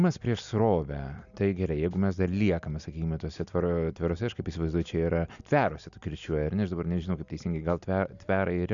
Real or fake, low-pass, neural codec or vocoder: real; 7.2 kHz; none